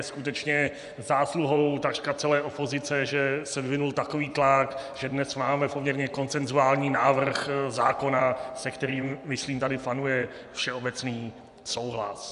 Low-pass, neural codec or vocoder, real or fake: 10.8 kHz; vocoder, 24 kHz, 100 mel bands, Vocos; fake